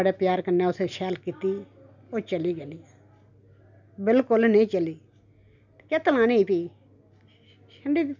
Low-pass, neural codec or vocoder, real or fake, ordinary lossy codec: 7.2 kHz; none; real; none